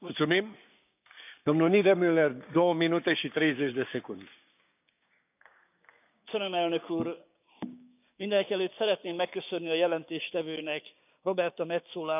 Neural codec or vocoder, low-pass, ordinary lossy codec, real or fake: codec, 16 kHz, 6 kbps, DAC; 3.6 kHz; none; fake